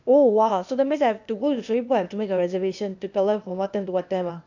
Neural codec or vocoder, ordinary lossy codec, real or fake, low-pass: codec, 16 kHz, 0.8 kbps, ZipCodec; none; fake; 7.2 kHz